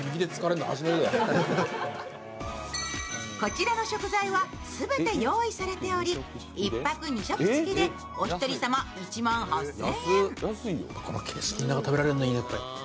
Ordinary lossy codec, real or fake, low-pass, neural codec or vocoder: none; real; none; none